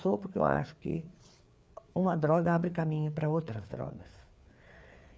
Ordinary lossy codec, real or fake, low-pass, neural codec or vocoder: none; fake; none; codec, 16 kHz, 4 kbps, FreqCodec, larger model